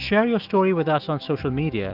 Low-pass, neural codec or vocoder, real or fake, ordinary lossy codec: 5.4 kHz; autoencoder, 48 kHz, 128 numbers a frame, DAC-VAE, trained on Japanese speech; fake; Opus, 24 kbps